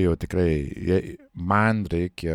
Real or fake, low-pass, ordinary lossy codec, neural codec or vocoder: real; 19.8 kHz; MP3, 96 kbps; none